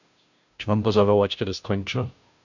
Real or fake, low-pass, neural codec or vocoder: fake; 7.2 kHz; codec, 16 kHz, 0.5 kbps, FunCodec, trained on Chinese and English, 25 frames a second